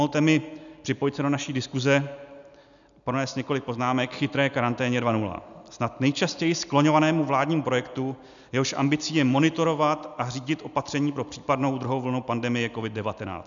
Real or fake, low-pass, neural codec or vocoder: real; 7.2 kHz; none